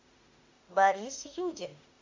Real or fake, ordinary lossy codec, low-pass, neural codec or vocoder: fake; MP3, 64 kbps; 7.2 kHz; autoencoder, 48 kHz, 32 numbers a frame, DAC-VAE, trained on Japanese speech